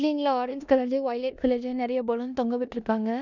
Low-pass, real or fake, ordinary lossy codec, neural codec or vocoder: 7.2 kHz; fake; none; codec, 16 kHz in and 24 kHz out, 0.9 kbps, LongCat-Audio-Codec, four codebook decoder